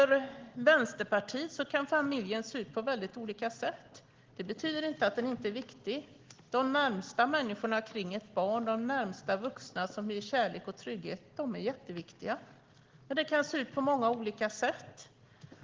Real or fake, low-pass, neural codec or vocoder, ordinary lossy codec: real; 7.2 kHz; none; Opus, 16 kbps